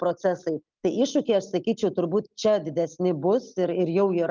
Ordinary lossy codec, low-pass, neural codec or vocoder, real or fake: Opus, 32 kbps; 7.2 kHz; none; real